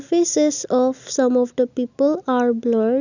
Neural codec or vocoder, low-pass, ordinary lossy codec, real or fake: none; 7.2 kHz; none; real